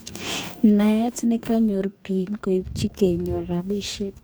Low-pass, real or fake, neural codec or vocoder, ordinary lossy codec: none; fake; codec, 44.1 kHz, 2.6 kbps, DAC; none